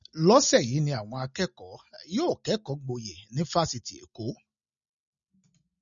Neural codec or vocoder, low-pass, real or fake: none; 7.2 kHz; real